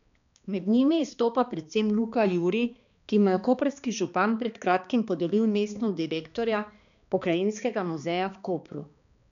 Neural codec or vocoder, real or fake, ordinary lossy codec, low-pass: codec, 16 kHz, 2 kbps, X-Codec, HuBERT features, trained on balanced general audio; fake; none; 7.2 kHz